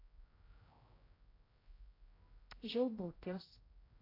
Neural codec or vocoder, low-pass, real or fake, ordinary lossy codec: codec, 16 kHz, 0.5 kbps, X-Codec, HuBERT features, trained on general audio; 5.4 kHz; fake; AAC, 24 kbps